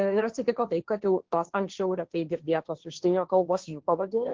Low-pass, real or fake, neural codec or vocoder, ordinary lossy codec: 7.2 kHz; fake; codec, 16 kHz, 1.1 kbps, Voila-Tokenizer; Opus, 16 kbps